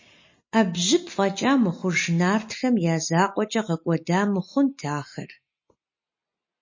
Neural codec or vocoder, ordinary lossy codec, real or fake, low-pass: none; MP3, 32 kbps; real; 7.2 kHz